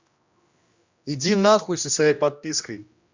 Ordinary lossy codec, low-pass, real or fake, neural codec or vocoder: Opus, 64 kbps; 7.2 kHz; fake; codec, 16 kHz, 1 kbps, X-Codec, HuBERT features, trained on general audio